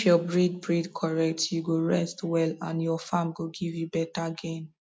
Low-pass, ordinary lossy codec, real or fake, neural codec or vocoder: none; none; real; none